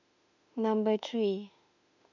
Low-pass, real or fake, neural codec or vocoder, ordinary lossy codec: 7.2 kHz; fake; autoencoder, 48 kHz, 32 numbers a frame, DAC-VAE, trained on Japanese speech; none